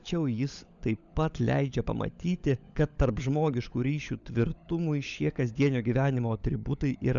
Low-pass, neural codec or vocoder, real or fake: 7.2 kHz; codec, 16 kHz, 16 kbps, FunCodec, trained on LibriTTS, 50 frames a second; fake